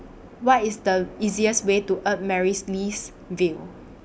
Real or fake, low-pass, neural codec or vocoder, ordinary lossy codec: real; none; none; none